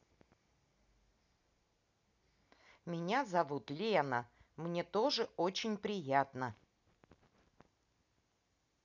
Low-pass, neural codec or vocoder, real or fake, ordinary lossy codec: 7.2 kHz; none; real; none